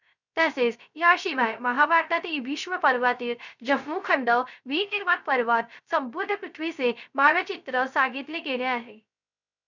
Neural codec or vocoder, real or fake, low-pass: codec, 16 kHz, 0.3 kbps, FocalCodec; fake; 7.2 kHz